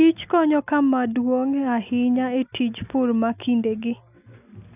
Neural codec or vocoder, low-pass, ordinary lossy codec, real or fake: none; 3.6 kHz; none; real